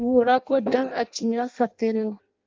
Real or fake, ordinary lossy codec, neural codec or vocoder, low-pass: fake; Opus, 24 kbps; codec, 16 kHz in and 24 kHz out, 0.6 kbps, FireRedTTS-2 codec; 7.2 kHz